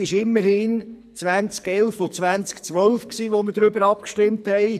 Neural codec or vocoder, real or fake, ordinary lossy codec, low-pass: codec, 44.1 kHz, 2.6 kbps, SNAC; fake; none; 14.4 kHz